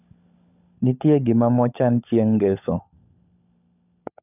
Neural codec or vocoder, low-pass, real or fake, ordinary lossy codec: codec, 16 kHz, 16 kbps, FunCodec, trained on LibriTTS, 50 frames a second; 3.6 kHz; fake; none